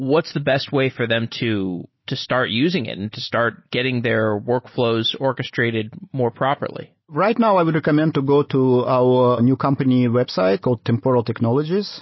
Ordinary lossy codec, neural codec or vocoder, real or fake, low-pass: MP3, 24 kbps; none; real; 7.2 kHz